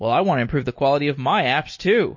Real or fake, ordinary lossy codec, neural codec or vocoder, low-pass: real; MP3, 32 kbps; none; 7.2 kHz